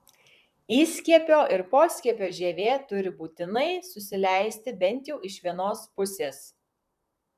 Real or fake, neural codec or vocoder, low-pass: fake; vocoder, 44.1 kHz, 128 mel bands, Pupu-Vocoder; 14.4 kHz